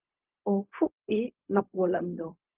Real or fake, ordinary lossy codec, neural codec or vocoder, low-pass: fake; Opus, 32 kbps; codec, 16 kHz, 0.4 kbps, LongCat-Audio-Codec; 3.6 kHz